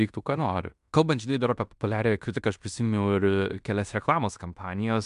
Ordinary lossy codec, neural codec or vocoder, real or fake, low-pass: MP3, 96 kbps; codec, 16 kHz in and 24 kHz out, 0.9 kbps, LongCat-Audio-Codec, fine tuned four codebook decoder; fake; 10.8 kHz